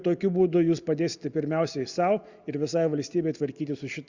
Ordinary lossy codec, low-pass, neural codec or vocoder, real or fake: Opus, 64 kbps; 7.2 kHz; none; real